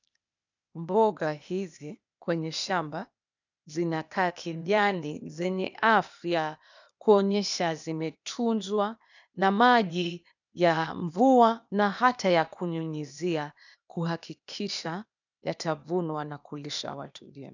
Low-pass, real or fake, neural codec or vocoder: 7.2 kHz; fake; codec, 16 kHz, 0.8 kbps, ZipCodec